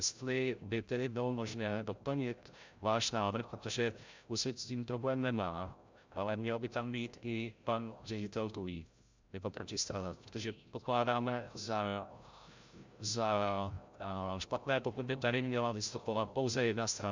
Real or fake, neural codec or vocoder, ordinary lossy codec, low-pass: fake; codec, 16 kHz, 0.5 kbps, FreqCodec, larger model; MP3, 64 kbps; 7.2 kHz